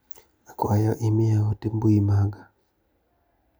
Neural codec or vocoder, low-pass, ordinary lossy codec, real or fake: none; none; none; real